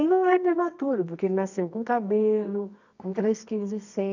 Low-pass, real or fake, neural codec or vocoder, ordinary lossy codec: 7.2 kHz; fake; codec, 24 kHz, 0.9 kbps, WavTokenizer, medium music audio release; none